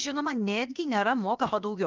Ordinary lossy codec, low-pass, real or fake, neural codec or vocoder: Opus, 24 kbps; 7.2 kHz; fake; codec, 16 kHz, 0.7 kbps, FocalCodec